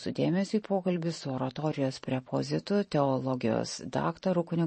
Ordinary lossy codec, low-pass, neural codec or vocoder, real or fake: MP3, 32 kbps; 10.8 kHz; vocoder, 44.1 kHz, 128 mel bands every 512 samples, BigVGAN v2; fake